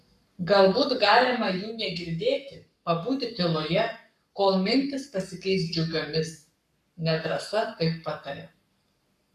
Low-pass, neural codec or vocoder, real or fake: 14.4 kHz; codec, 44.1 kHz, 7.8 kbps, Pupu-Codec; fake